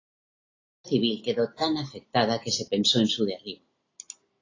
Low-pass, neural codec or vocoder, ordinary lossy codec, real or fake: 7.2 kHz; none; AAC, 32 kbps; real